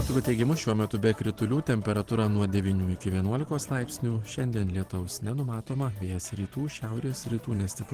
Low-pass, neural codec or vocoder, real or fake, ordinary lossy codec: 14.4 kHz; vocoder, 44.1 kHz, 128 mel bands every 512 samples, BigVGAN v2; fake; Opus, 16 kbps